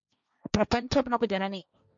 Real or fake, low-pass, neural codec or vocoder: fake; 7.2 kHz; codec, 16 kHz, 1.1 kbps, Voila-Tokenizer